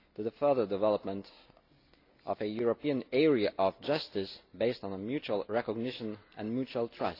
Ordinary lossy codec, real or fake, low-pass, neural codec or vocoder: AAC, 32 kbps; real; 5.4 kHz; none